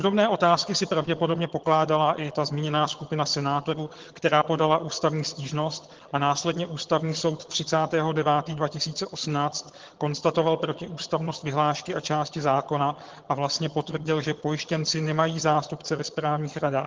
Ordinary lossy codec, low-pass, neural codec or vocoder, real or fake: Opus, 16 kbps; 7.2 kHz; vocoder, 22.05 kHz, 80 mel bands, HiFi-GAN; fake